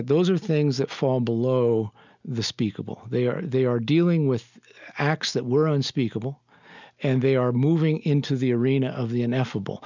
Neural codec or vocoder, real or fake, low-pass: none; real; 7.2 kHz